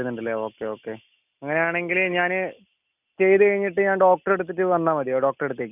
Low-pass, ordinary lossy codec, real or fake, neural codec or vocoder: 3.6 kHz; none; real; none